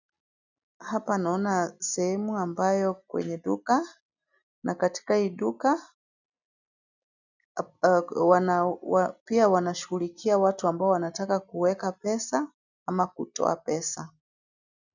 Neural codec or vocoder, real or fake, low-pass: none; real; 7.2 kHz